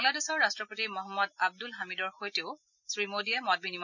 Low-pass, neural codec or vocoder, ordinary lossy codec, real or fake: 7.2 kHz; none; none; real